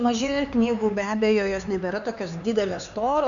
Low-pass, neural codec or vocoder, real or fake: 7.2 kHz; codec, 16 kHz, 4 kbps, X-Codec, HuBERT features, trained on LibriSpeech; fake